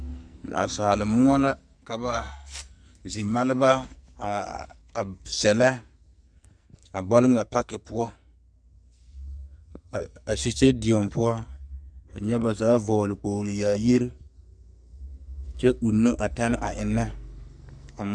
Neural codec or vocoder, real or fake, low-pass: codec, 44.1 kHz, 2.6 kbps, SNAC; fake; 9.9 kHz